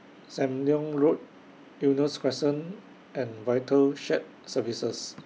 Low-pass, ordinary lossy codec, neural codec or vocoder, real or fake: none; none; none; real